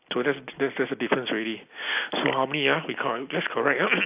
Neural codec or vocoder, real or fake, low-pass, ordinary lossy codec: none; real; 3.6 kHz; AAC, 32 kbps